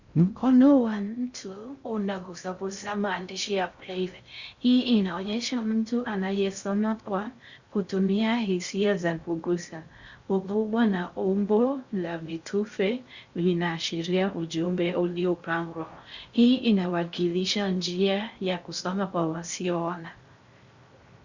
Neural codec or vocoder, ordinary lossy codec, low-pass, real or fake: codec, 16 kHz in and 24 kHz out, 0.6 kbps, FocalCodec, streaming, 4096 codes; Opus, 64 kbps; 7.2 kHz; fake